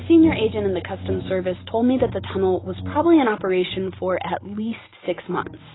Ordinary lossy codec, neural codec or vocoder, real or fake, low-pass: AAC, 16 kbps; none; real; 7.2 kHz